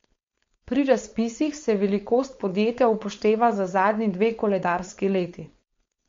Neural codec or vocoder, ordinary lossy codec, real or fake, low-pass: codec, 16 kHz, 4.8 kbps, FACodec; MP3, 48 kbps; fake; 7.2 kHz